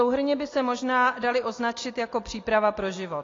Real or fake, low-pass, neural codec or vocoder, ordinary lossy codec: real; 7.2 kHz; none; AAC, 32 kbps